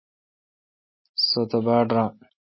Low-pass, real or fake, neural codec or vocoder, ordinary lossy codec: 7.2 kHz; real; none; MP3, 24 kbps